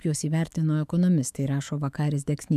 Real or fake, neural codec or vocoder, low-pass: fake; autoencoder, 48 kHz, 128 numbers a frame, DAC-VAE, trained on Japanese speech; 14.4 kHz